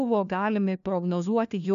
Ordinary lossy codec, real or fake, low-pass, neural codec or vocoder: MP3, 96 kbps; fake; 7.2 kHz; codec, 16 kHz, 1 kbps, FunCodec, trained on LibriTTS, 50 frames a second